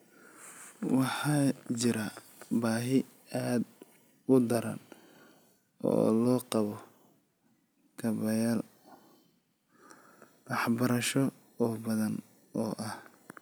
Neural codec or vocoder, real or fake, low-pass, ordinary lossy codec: none; real; none; none